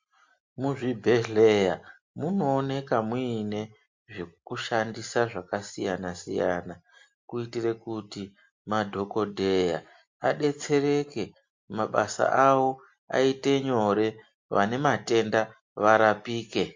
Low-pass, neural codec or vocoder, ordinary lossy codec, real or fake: 7.2 kHz; none; MP3, 48 kbps; real